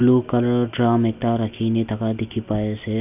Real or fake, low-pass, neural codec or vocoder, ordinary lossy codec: real; 3.6 kHz; none; none